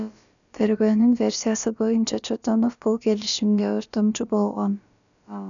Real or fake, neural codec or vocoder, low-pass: fake; codec, 16 kHz, about 1 kbps, DyCAST, with the encoder's durations; 7.2 kHz